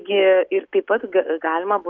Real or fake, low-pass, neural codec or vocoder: real; 7.2 kHz; none